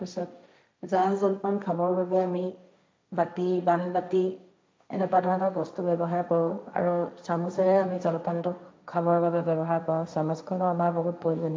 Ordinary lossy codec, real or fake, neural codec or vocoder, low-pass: none; fake; codec, 16 kHz, 1.1 kbps, Voila-Tokenizer; none